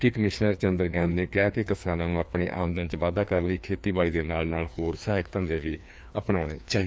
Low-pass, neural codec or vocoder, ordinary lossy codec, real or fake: none; codec, 16 kHz, 2 kbps, FreqCodec, larger model; none; fake